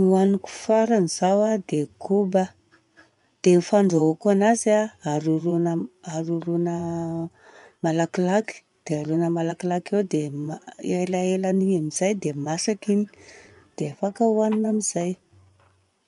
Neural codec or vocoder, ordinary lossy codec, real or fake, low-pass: vocoder, 24 kHz, 100 mel bands, Vocos; none; fake; 10.8 kHz